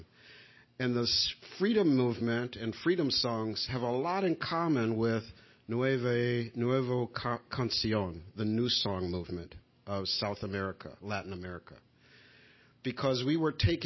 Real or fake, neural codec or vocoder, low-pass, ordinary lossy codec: real; none; 7.2 kHz; MP3, 24 kbps